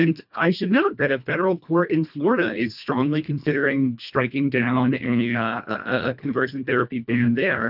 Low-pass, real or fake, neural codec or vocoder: 5.4 kHz; fake; codec, 24 kHz, 1.5 kbps, HILCodec